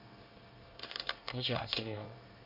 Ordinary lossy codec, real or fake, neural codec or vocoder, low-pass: none; fake; codec, 24 kHz, 1 kbps, SNAC; 5.4 kHz